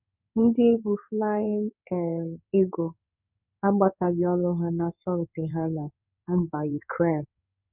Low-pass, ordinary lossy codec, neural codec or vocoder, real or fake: 3.6 kHz; none; codec, 16 kHz in and 24 kHz out, 1 kbps, XY-Tokenizer; fake